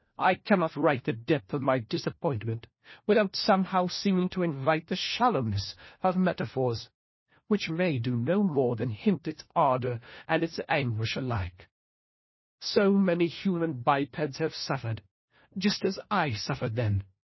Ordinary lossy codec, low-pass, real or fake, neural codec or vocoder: MP3, 24 kbps; 7.2 kHz; fake; codec, 16 kHz, 1 kbps, FunCodec, trained on LibriTTS, 50 frames a second